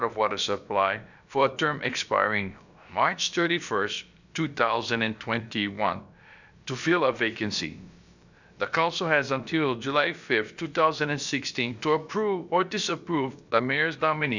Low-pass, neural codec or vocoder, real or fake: 7.2 kHz; codec, 16 kHz, about 1 kbps, DyCAST, with the encoder's durations; fake